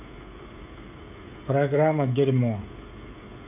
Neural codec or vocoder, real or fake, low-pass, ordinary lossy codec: codec, 16 kHz, 16 kbps, FreqCodec, smaller model; fake; 3.6 kHz; none